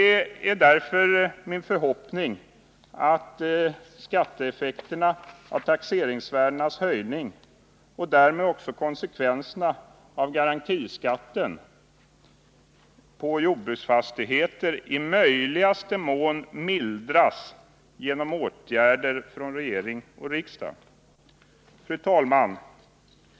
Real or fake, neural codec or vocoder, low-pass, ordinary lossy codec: real; none; none; none